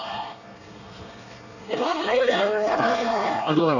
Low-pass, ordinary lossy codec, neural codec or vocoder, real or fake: 7.2 kHz; none; codec, 24 kHz, 1 kbps, SNAC; fake